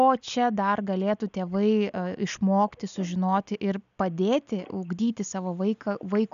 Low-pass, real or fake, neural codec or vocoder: 7.2 kHz; real; none